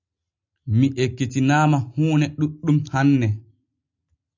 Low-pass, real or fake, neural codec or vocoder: 7.2 kHz; real; none